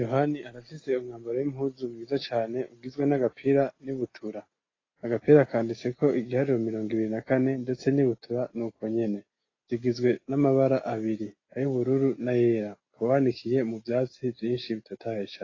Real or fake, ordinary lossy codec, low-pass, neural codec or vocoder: real; AAC, 32 kbps; 7.2 kHz; none